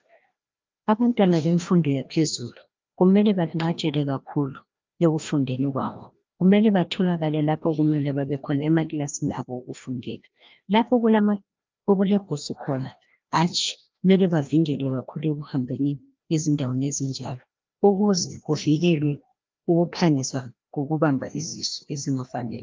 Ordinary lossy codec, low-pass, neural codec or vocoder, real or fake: Opus, 24 kbps; 7.2 kHz; codec, 16 kHz, 1 kbps, FreqCodec, larger model; fake